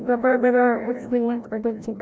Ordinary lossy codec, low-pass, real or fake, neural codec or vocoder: none; none; fake; codec, 16 kHz, 0.5 kbps, FreqCodec, larger model